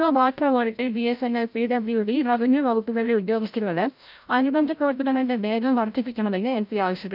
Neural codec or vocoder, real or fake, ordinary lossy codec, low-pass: codec, 16 kHz, 0.5 kbps, FreqCodec, larger model; fake; none; 5.4 kHz